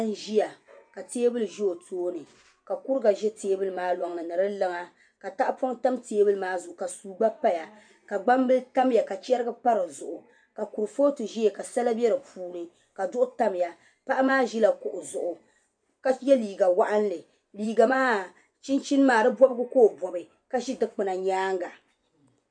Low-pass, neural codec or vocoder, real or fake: 9.9 kHz; vocoder, 24 kHz, 100 mel bands, Vocos; fake